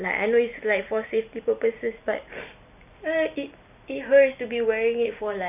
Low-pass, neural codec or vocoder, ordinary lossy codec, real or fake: 3.6 kHz; vocoder, 44.1 kHz, 128 mel bands every 256 samples, BigVGAN v2; none; fake